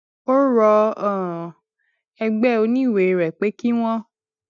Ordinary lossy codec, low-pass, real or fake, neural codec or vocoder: none; 7.2 kHz; real; none